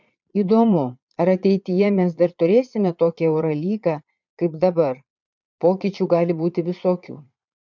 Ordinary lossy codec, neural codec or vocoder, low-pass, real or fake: MP3, 64 kbps; vocoder, 22.05 kHz, 80 mel bands, Vocos; 7.2 kHz; fake